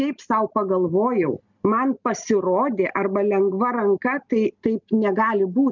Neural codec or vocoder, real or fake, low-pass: none; real; 7.2 kHz